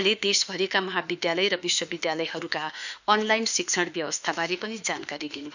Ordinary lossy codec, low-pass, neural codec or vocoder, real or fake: none; 7.2 kHz; autoencoder, 48 kHz, 32 numbers a frame, DAC-VAE, trained on Japanese speech; fake